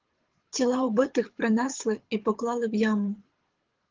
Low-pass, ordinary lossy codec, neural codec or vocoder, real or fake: 7.2 kHz; Opus, 16 kbps; codec, 24 kHz, 6 kbps, HILCodec; fake